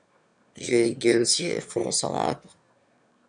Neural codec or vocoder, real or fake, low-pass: autoencoder, 22.05 kHz, a latent of 192 numbers a frame, VITS, trained on one speaker; fake; 9.9 kHz